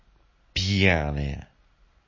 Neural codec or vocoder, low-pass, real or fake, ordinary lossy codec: none; 7.2 kHz; real; MP3, 32 kbps